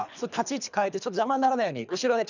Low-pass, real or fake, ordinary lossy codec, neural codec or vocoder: 7.2 kHz; fake; none; codec, 24 kHz, 3 kbps, HILCodec